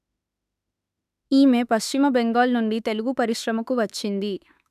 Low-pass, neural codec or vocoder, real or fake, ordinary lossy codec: 14.4 kHz; autoencoder, 48 kHz, 32 numbers a frame, DAC-VAE, trained on Japanese speech; fake; none